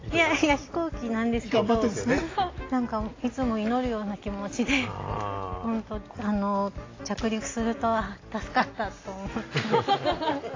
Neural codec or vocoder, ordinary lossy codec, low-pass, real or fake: none; AAC, 32 kbps; 7.2 kHz; real